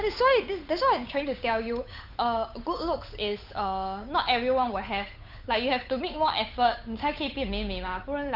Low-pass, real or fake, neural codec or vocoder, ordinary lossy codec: 5.4 kHz; fake; codec, 16 kHz, 8 kbps, FunCodec, trained on Chinese and English, 25 frames a second; none